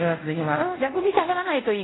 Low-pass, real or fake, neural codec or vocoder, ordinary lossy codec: 7.2 kHz; fake; codec, 24 kHz, 0.5 kbps, DualCodec; AAC, 16 kbps